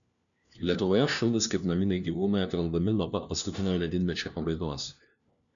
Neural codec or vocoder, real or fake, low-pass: codec, 16 kHz, 1 kbps, FunCodec, trained on LibriTTS, 50 frames a second; fake; 7.2 kHz